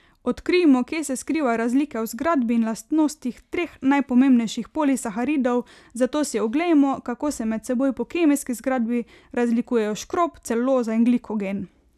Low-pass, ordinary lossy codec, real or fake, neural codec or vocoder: 14.4 kHz; none; real; none